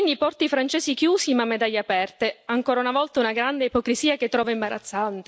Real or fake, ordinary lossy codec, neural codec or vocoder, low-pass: real; none; none; none